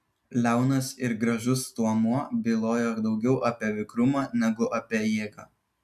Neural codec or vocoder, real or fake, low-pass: none; real; 14.4 kHz